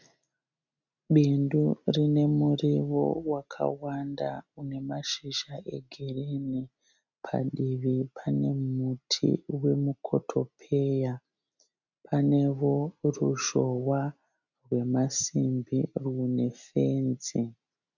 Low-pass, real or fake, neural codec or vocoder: 7.2 kHz; real; none